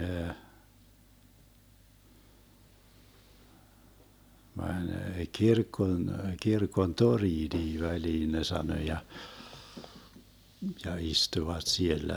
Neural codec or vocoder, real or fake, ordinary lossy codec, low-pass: none; real; none; none